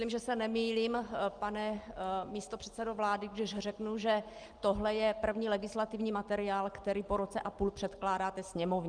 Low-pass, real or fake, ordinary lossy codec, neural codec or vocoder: 9.9 kHz; real; Opus, 24 kbps; none